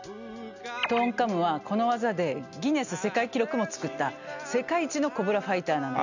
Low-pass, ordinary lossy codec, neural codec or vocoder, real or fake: 7.2 kHz; none; none; real